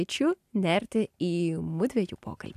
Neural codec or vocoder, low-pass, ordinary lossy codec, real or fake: none; 14.4 kHz; AAC, 96 kbps; real